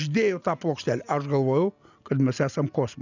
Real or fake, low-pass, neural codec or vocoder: real; 7.2 kHz; none